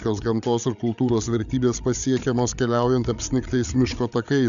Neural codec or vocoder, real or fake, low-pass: codec, 16 kHz, 8 kbps, FreqCodec, larger model; fake; 7.2 kHz